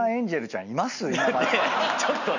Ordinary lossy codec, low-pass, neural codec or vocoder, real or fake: none; 7.2 kHz; none; real